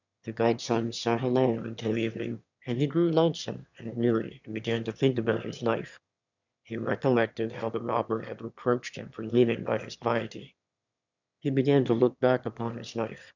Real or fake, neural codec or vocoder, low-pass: fake; autoencoder, 22.05 kHz, a latent of 192 numbers a frame, VITS, trained on one speaker; 7.2 kHz